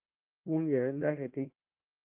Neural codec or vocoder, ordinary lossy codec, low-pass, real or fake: codec, 16 kHz, 1 kbps, FunCodec, trained on Chinese and English, 50 frames a second; Opus, 32 kbps; 3.6 kHz; fake